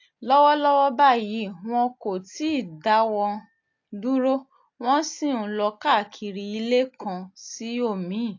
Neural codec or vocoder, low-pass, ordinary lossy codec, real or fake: none; 7.2 kHz; AAC, 48 kbps; real